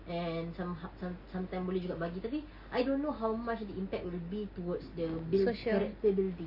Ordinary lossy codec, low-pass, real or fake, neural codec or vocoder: none; 5.4 kHz; real; none